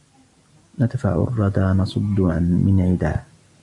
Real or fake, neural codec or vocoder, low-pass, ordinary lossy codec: real; none; 10.8 kHz; AAC, 64 kbps